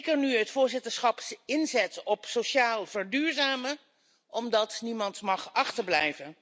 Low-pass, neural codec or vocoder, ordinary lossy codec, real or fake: none; none; none; real